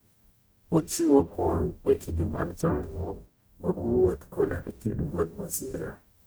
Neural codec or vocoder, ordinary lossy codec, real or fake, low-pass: codec, 44.1 kHz, 0.9 kbps, DAC; none; fake; none